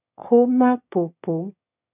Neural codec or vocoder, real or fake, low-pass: autoencoder, 22.05 kHz, a latent of 192 numbers a frame, VITS, trained on one speaker; fake; 3.6 kHz